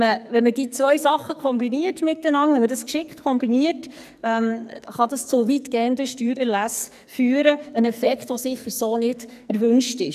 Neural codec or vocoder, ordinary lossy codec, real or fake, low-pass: codec, 44.1 kHz, 2.6 kbps, SNAC; none; fake; 14.4 kHz